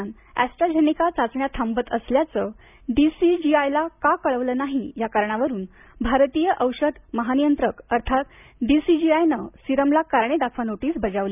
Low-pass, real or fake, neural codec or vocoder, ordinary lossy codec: 3.6 kHz; real; none; none